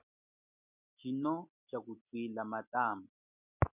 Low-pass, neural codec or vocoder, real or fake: 3.6 kHz; none; real